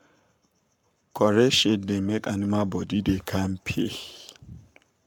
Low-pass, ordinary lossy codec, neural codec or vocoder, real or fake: 19.8 kHz; MP3, 96 kbps; codec, 44.1 kHz, 7.8 kbps, Pupu-Codec; fake